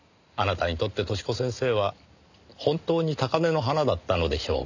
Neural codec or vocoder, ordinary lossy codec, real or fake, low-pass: none; none; real; 7.2 kHz